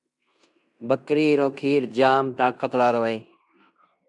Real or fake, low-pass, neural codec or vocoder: fake; 10.8 kHz; codec, 16 kHz in and 24 kHz out, 0.9 kbps, LongCat-Audio-Codec, fine tuned four codebook decoder